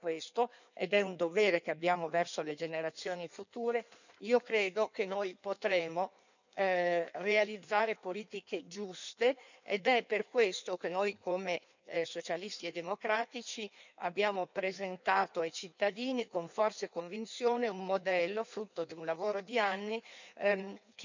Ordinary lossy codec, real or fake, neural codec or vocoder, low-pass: none; fake; codec, 16 kHz in and 24 kHz out, 1.1 kbps, FireRedTTS-2 codec; 7.2 kHz